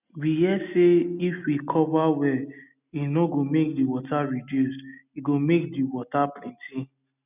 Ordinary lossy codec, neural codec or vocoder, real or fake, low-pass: none; none; real; 3.6 kHz